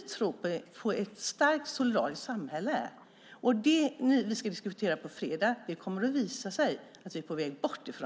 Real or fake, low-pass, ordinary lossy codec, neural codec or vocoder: real; none; none; none